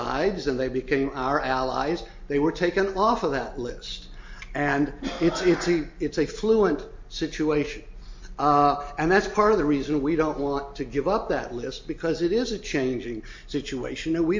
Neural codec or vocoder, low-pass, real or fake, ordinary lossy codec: none; 7.2 kHz; real; MP3, 48 kbps